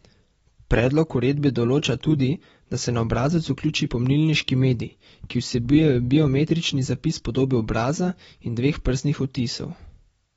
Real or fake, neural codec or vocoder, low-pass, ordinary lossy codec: real; none; 19.8 kHz; AAC, 24 kbps